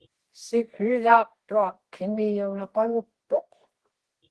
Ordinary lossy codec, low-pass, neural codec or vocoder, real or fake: Opus, 16 kbps; 10.8 kHz; codec, 24 kHz, 0.9 kbps, WavTokenizer, medium music audio release; fake